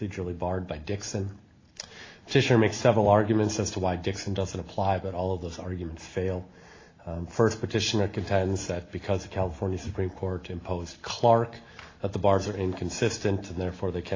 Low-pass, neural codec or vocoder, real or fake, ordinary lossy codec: 7.2 kHz; none; real; AAC, 32 kbps